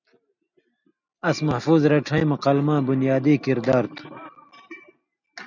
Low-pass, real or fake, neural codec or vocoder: 7.2 kHz; real; none